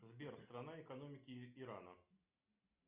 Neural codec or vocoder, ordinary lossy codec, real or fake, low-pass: none; Opus, 64 kbps; real; 3.6 kHz